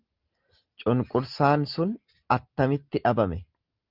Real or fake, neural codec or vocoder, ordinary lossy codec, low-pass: real; none; Opus, 32 kbps; 5.4 kHz